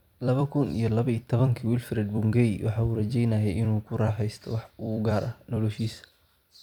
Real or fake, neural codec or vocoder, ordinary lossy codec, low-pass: fake; vocoder, 44.1 kHz, 128 mel bands every 256 samples, BigVGAN v2; none; 19.8 kHz